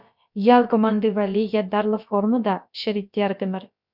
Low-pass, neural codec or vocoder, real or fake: 5.4 kHz; codec, 16 kHz, about 1 kbps, DyCAST, with the encoder's durations; fake